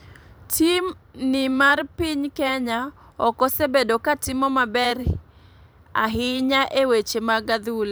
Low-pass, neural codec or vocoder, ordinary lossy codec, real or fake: none; vocoder, 44.1 kHz, 128 mel bands every 512 samples, BigVGAN v2; none; fake